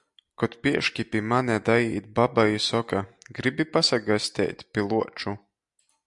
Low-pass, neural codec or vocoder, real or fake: 10.8 kHz; none; real